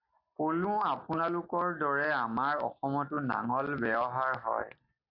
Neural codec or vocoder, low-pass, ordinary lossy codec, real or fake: none; 5.4 kHz; AAC, 48 kbps; real